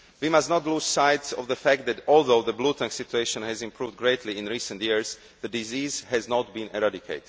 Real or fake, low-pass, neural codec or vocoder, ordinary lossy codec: real; none; none; none